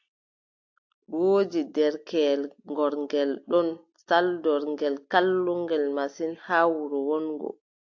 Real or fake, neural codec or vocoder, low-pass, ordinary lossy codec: real; none; 7.2 kHz; AAC, 48 kbps